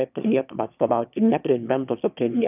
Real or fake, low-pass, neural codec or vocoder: fake; 3.6 kHz; autoencoder, 22.05 kHz, a latent of 192 numbers a frame, VITS, trained on one speaker